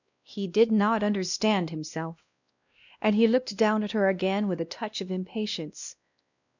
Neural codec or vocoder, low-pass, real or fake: codec, 16 kHz, 1 kbps, X-Codec, WavLM features, trained on Multilingual LibriSpeech; 7.2 kHz; fake